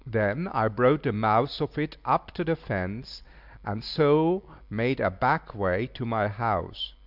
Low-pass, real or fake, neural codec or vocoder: 5.4 kHz; real; none